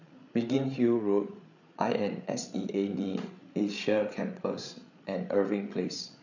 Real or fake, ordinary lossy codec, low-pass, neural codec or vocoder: fake; none; 7.2 kHz; codec, 16 kHz, 8 kbps, FreqCodec, larger model